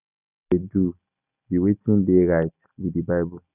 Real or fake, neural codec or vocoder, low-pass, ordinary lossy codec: real; none; 3.6 kHz; none